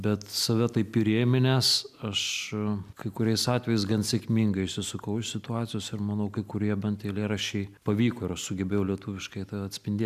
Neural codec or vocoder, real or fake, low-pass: none; real; 14.4 kHz